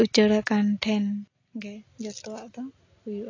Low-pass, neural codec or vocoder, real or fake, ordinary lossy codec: 7.2 kHz; none; real; AAC, 32 kbps